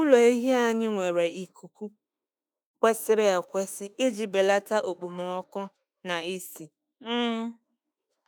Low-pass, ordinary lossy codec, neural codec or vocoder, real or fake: none; none; autoencoder, 48 kHz, 32 numbers a frame, DAC-VAE, trained on Japanese speech; fake